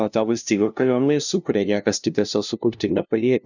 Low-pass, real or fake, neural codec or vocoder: 7.2 kHz; fake; codec, 16 kHz, 0.5 kbps, FunCodec, trained on LibriTTS, 25 frames a second